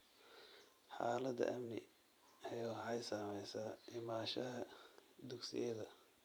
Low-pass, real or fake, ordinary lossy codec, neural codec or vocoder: none; fake; none; vocoder, 44.1 kHz, 128 mel bands every 512 samples, BigVGAN v2